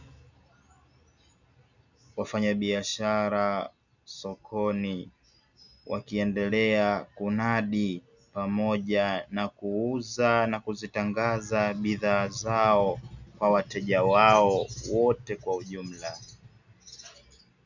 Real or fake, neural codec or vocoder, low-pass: real; none; 7.2 kHz